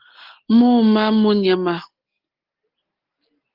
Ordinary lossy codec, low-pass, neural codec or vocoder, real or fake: Opus, 16 kbps; 5.4 kHz; none; real